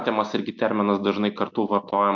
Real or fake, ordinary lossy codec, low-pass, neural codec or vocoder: real; MP3, 48 kbps; 7.2 kHz; none